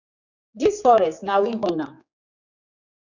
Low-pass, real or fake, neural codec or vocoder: 7.2 kHz; fake; codec, 16 kHz, 4 kbps, X-Codec, HuBERT features, trained on general audio